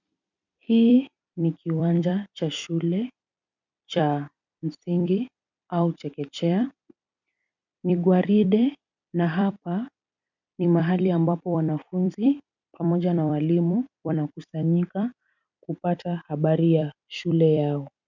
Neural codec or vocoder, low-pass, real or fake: vocoder, 44.1 kHz, 128 mel bands every 256 samples, BigVGAN v2; 7.2 kHz; fake